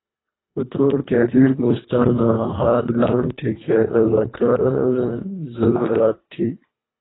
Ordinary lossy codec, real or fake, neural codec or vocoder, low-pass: AAC, 16 kbps; fake; codec, 24 kHz, 1.5 kbps, HILCodec; 7.2 kHz